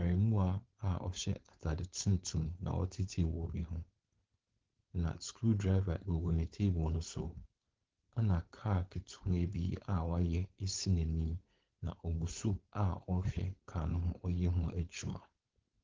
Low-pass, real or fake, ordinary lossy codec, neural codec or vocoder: 7.2 kHz; fake; Opus, 16 kbps; codec, 16 kHz, 4.8 kbps, FACodec